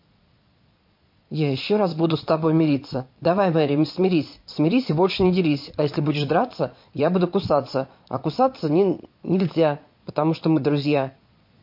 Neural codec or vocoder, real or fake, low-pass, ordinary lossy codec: vocoder, 22.05 kHz, 80 mel bands, Vocos; fake; 5.4 kHz; MP3, 32 kbps